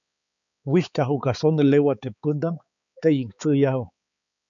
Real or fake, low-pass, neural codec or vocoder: fake; 7.2 kHz; codec, 16 kHz, 4 kbps, X-Codec, HuBERT features, trained on balanced general audio